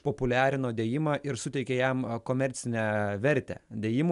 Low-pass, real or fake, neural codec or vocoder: 10.8 kHz; real; none